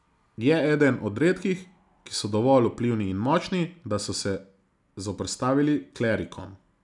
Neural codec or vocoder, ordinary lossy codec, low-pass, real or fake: none; none; 10.8 kHz; real